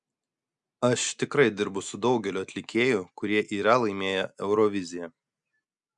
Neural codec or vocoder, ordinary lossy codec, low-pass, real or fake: none; MP3, 96 kbps; 10.8 kHz; real